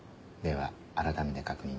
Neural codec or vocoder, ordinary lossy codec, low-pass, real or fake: none; none; none; real